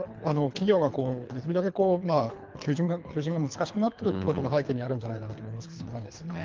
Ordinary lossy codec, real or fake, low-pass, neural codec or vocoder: Opus, 32 kbps; fake; 7.2 kHz; codec, 24 kHz, 3 kbps, HILCodec